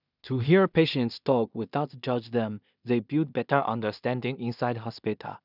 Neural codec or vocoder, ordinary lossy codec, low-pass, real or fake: codec, 16 kHz in and 24 kHz out, 0.4 kbps, LongCat-Audio-Codec, two codebook decoder; none; 5.4 kHz; fake